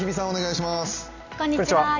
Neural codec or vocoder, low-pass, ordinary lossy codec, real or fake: none; 7.2 kHz; AAC, 48 kbps; real